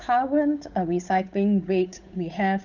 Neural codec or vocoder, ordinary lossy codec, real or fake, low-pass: codec, 16 kHz, 8 kbps, FunCodec, trained on Chinese and English, 25 frames a second; none; fake; 7.2 kHz